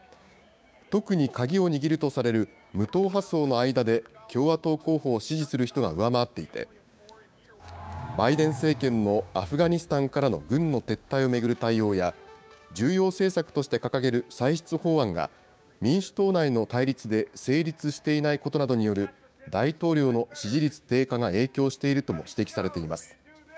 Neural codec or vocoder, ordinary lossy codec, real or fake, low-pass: codec, 16 kHz, 6 kbps, DAC; none; fake; none